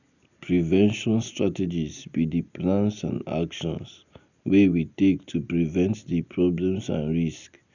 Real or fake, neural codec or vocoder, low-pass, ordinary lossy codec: real; none; 7.2 kHz; none